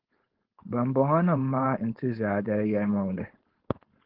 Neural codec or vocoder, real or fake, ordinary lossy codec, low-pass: codec, 16 kHz, 4.8 kbps, FACodec; fake; Opus, 16 kbps; 5.4 kHz